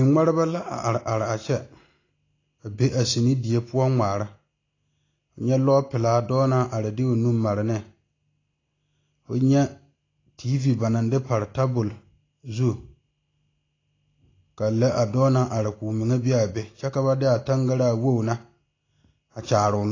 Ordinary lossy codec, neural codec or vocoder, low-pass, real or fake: AAC, 32 kbps; none; 7.2 kHz; real